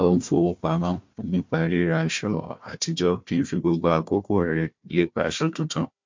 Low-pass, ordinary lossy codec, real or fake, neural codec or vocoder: 7.2 kHz; MP3, 48 kbps; fake; codec, 16 kHz, 1 kbps, FunCodec, trained on Chinese and English, 50 frames a second